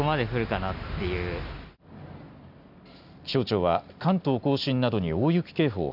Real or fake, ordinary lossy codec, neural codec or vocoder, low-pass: real; none; none; 5.4 kHz